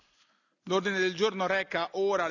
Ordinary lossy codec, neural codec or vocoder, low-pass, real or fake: none; none; 7.2 kHz; real